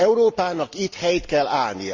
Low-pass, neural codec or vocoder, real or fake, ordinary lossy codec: 7.2 kHz; none; real; Opus, 32 kbps